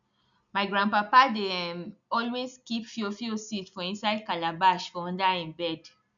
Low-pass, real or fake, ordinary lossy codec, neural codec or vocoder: 7.2 kHz; real; MP3, 96 kbps; none